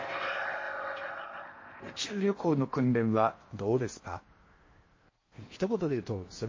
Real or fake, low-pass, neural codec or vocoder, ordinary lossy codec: fake; 7.2 kHz; codec, 16 kHz in and 24 kHz out, 0.8 kbps, FocalCodec, streaming, 65536 codes; MP3, 32 kbps